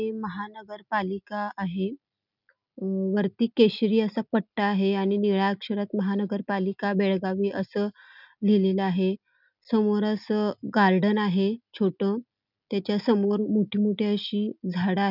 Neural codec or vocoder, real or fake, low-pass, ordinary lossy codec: none; real; 5.4 kHz; none